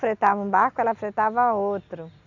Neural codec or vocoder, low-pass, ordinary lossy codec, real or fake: none; 7.2 kHz; none; real